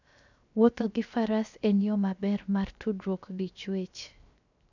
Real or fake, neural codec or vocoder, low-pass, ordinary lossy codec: fake; codec, 16 kHz, 0.7 kbps, FocalCodec; 7.2 kHz; none